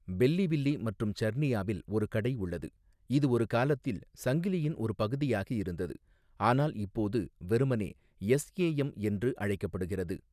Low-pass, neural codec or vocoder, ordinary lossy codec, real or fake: 14.4 kHz; none; none; real